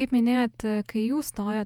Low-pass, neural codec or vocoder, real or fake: 19.8 kHz; vocoder, 48 kHz, 128 mel bands, Vocos; fake